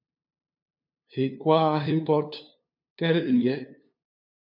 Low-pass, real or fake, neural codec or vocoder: 5.4 kHz; fake; codec, 16 kHz, 2 kbps, FunCodec, trained on LibriTTS, 25 frames a second